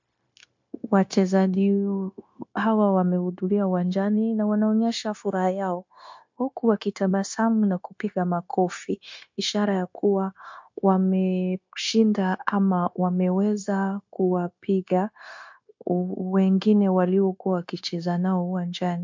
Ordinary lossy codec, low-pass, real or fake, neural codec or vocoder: MP3, 48 kbps; 7.2 kHz; fake; codec, 16 kHz, 0.9 kbps, LongCat-Audio-Codec